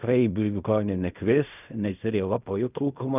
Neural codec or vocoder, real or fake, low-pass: codec, 16 kHz in and 24 kHz out, 0.4 kbps, LongCat-Audio-Codec, fine tuned four codebook decoder; fake; 3.6 kHz